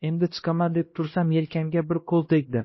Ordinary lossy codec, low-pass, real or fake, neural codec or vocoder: MP3, 24 kbps; 7.2 kHz; fake; codec, 16 kHz, 1 kbps, X-Codec, HuBERT features, trained on LibriSpeech